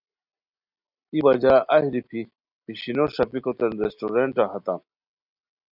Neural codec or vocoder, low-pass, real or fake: none; 5.4 kHz; real